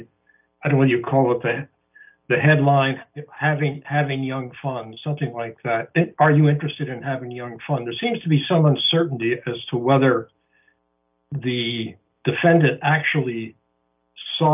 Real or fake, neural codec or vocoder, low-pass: real; none; 3.6 kHz